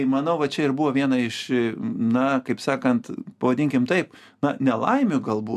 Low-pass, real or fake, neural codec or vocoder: 14.4 kHz; real; none